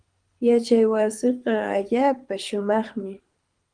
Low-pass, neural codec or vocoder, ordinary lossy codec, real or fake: 9.9 kHz; codec, 24 kHz, 6 kbps, HILCodec; Opus, 32 kbps; fake